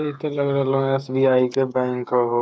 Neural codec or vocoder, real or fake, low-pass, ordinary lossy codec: codec, 16 kHz, 8 kbps, FreqCodec, smaller model; fake; none; none